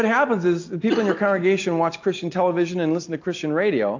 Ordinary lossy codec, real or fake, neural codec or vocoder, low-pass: AAC, 48 kbps; real; none; 7.2 kHz